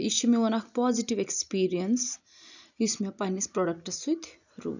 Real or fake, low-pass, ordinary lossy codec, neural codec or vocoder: real; 7.2 kHz; none; none